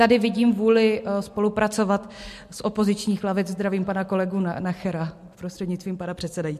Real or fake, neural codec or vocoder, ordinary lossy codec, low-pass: real; none; MP3, 64 kbps; 14.4 kHz